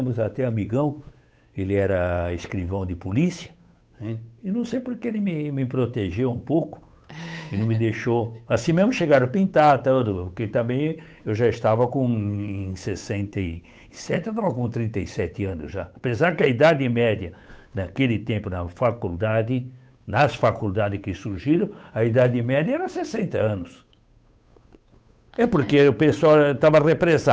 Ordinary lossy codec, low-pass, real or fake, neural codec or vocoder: none; none; fake; codec, 16 kHz, 8 kbps, FunCodec, trained on Chinese and English, 25 frames a second